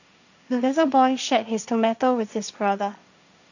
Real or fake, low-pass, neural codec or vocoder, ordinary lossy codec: fake; 7.2 kHz; codec, 16 kHz, 1.1 kbps, Voila-Tokenizer; none